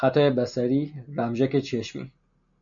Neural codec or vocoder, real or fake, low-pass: none; real; 7.2 kHz